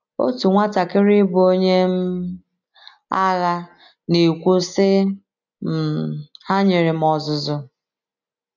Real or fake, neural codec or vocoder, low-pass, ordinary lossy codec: real; none; 7.2 kHz; none